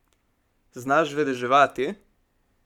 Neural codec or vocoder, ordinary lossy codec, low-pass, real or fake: codec, 44.1 kHz, 7.8 kbps, Pupu-Codec; none; 19.8 kHz; fake